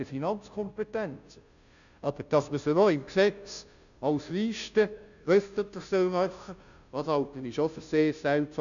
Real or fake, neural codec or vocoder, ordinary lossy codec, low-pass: fake; codec, 16 kHz, 0.5 kbps, FunCodec, trained on Chinese and English, 25 frames a second; none; 7.2 kHz